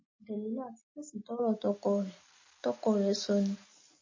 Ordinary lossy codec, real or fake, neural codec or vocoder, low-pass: MP3, 32 kbps; real; none; 7.2 kHz